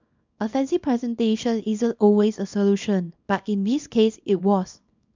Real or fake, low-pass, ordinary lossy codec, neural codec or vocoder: fake; 7.2 kHz; MP3, 64 kbps; codec, 24 kHz, 0.9 kbps, WavTokenizer, small release